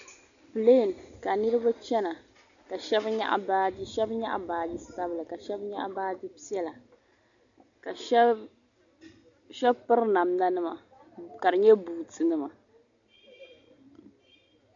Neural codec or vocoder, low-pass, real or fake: none; 7.2 kHz; real